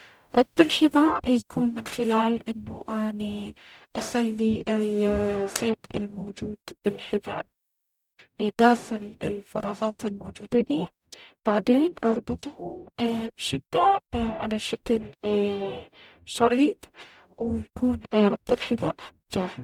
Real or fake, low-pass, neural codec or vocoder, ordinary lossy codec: fake; 19.8 kHz; codec, 44.1 kHz, 0.9 kbps, DAC; none